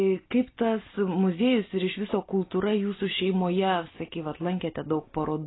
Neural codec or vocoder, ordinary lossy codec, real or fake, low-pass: none; AAC, 16 kbps; real; 7.2 kHz